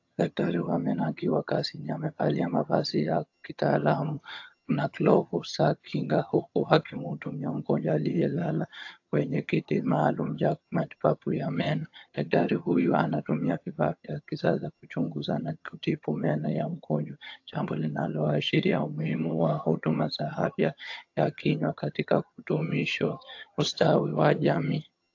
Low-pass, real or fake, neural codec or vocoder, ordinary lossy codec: 7.2 kHz; fake; vocoder, 22.05 kHz, 80 mel bands, HiFi-GAN; AAC, 48 kbps